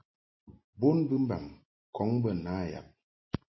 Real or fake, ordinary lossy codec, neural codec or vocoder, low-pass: real; MP3, 24 kbps; none; 7.2 kHz